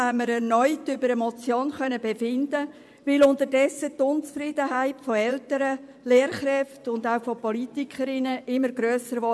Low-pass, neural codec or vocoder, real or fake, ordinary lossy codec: none; vocoder, 24 kHz, 100 mel bands, Vocos; fake; none